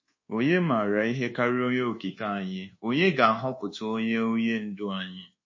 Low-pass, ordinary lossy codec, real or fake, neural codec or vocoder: 7.2 kHz; MP3, 32 kbps; fake; codec, 24 kHz, 1.2 kbps, DualCodec